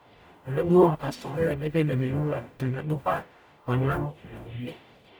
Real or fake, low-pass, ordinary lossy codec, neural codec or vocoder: fake; none; none; codec, 44.1 kHz, 0.9 kbps, DAC